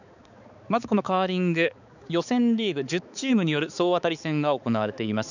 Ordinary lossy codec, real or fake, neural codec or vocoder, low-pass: none; fake; codec, 16 kHz, 4 kbps, X-Codec, HuBERT features, trained on balanced general audio; 7.2 kHz